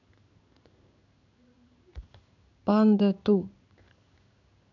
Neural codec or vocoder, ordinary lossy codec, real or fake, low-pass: codec, 16 kHz in and 24 kHz out, 1 kbps, XY-Tokenizer; none; fake; 7.2 kHz